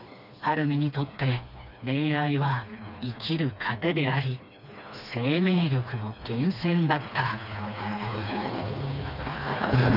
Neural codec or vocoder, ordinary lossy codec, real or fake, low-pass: codec, 16 kHz, 2 kbps, FreqCodec, smaller model; none; fake; 5.4 kHz